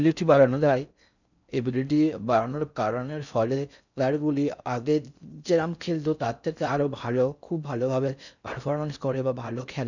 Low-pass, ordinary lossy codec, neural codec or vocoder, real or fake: 7.2 kHz; none; codec, 16 kHz in and 24 kHz out, 0.6 kbps, FocalCodec, streaming, 4096 codes; fake